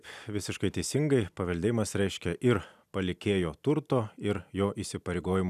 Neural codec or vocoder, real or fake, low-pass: none; real; 14.4 kHz